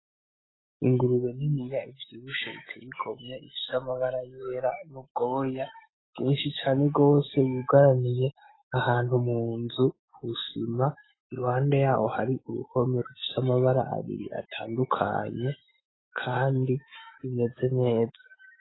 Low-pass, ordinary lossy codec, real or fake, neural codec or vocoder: 7.2 kHz; AAC, 16 kbps; fake; autoencoder, 48 kHz, 128 numbers a frame, DAC-VAE, trained on Japanese speech